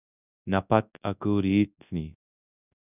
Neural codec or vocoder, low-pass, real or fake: codec, 24 kHz, 0.9 kbps, WavTokenizer, large speech release; 3.6 kHz; fake